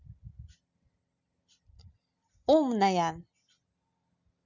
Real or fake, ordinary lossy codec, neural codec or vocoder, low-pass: real; none; none; 7.2 kHz